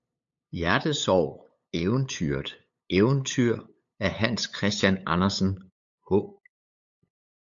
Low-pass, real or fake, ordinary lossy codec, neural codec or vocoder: 7.2 kHz; fake; AAC, 64 kbps; codec, 16 kHz, 8 kbps, FunCodec, trained on LibriTTS, 25 frames a second